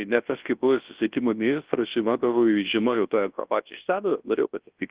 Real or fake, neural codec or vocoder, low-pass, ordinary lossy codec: fake; codec, 24 kHz, 0.9 kbps, WavTokenizer, large speech release; 3.6 kHz; Opus, 16 kbps